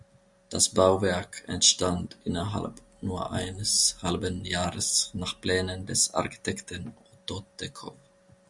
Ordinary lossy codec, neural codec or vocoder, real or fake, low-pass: Opus, 64 kbps; none; real; 10.8 kHz